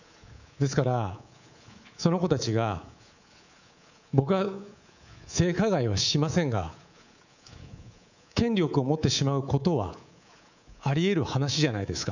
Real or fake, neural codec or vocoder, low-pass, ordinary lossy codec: fake; codec, 24 kHz, 3.1 kbps, DualCodec; 7.2 kHz; none